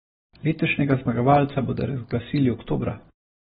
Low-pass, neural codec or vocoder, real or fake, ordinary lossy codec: 9.9 kHz; none; real; AAC, 16 kbps